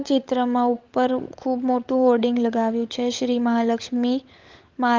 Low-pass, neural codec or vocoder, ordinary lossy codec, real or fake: 7.2 kHz; codec, 16 kHz, 16 kbps, FunCodec, trained on LibriTTS, 50 frames a second; Opus, 32 kbps; fake